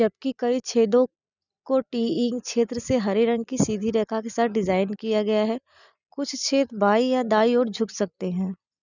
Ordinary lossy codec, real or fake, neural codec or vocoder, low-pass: none; real; none; 7.2 kHz